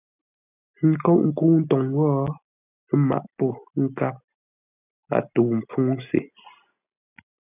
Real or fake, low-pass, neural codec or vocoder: real; 3.6 kHz; none